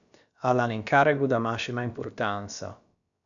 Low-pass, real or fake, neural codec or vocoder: 7.2 kHz; fake; codec, 16 kHz, about 1 kbps, DyCAST, with the encoder's durations